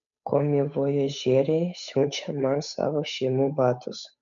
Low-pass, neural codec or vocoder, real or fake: 7.2 kHz; codec, 16 kHz, 8 kbps, FunCodec, trained on Chinese and English, 25 frames a second; fake